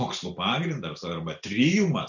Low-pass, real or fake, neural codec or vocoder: 7.2 kHz; real; none